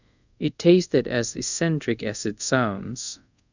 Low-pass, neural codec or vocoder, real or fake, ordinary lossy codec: 7.2 kHz; codec, 24 kHz, 0.5 kbps, DualCodec; fake; none